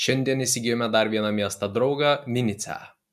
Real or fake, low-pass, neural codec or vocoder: real; 14.4 kHz; none